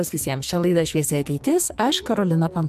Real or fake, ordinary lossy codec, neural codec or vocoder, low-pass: fake; MP3, 96 kbps; codec, 44.1 kHz, 2.6 kbps, SNAC; 14.4 kHz